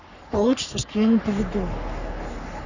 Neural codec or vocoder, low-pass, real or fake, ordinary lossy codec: codec, 44.1 kHz, 3.4 kbps, Pupu-Codec; 7.2 kHz; fake; none